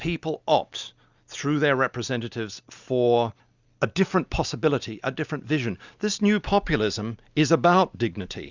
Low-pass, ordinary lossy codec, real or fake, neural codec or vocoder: 7.2 kHz; Opus, 64 kbps; real; none